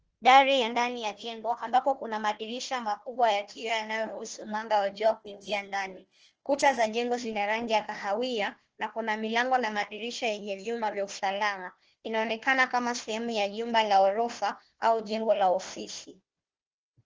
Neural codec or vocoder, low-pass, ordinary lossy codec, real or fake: codec, 16 kHz, 1 kbps, FunCodec, trained on Chinese and English, 50 frames a second; 7.2 kHz; Opus, 16 kbps; fake